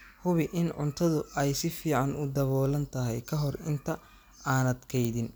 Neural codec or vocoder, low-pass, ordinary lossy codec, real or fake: none; none; none; real